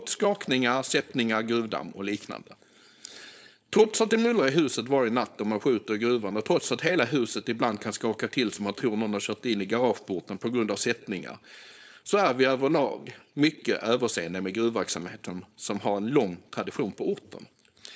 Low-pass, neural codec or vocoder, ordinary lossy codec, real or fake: none; codec, 16 kHz, 4.8 kbps, FACodec; none; fake